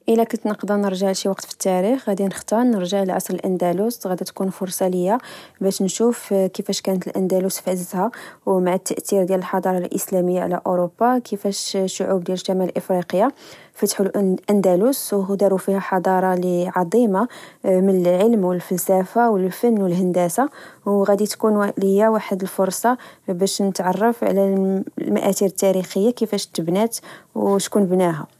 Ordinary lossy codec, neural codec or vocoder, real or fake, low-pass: none; none; real; 14.4 kHz